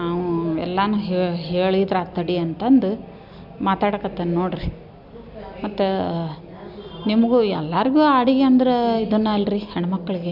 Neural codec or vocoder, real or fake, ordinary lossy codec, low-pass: none; real; none; 5.4 kHz